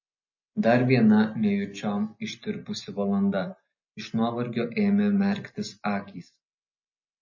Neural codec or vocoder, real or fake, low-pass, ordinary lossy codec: none; real; 7.2 kHz; MP3, 32 kbps